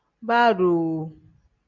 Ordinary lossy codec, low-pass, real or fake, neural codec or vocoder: MP3, 64 kbps; 7.2 kHz; real; none